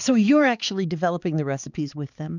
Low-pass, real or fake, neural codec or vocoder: 7.2 kHz; fake; codec, 16 kHz, 4 kbps, X-Codec, HuBERT features, trained on balanced general audio